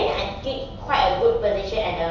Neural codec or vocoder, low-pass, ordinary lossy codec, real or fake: none; 7.2 kHz; none; real